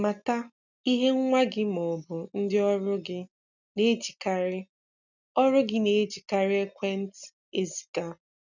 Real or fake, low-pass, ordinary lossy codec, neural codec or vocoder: real; 7.2 kHz; none; none